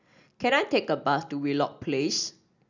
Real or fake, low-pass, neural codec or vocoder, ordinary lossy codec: real; 7.2 kHz; none; none